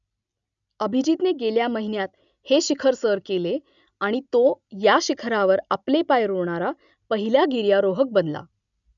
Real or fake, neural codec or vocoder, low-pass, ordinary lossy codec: real; none; 7.2 kHz; none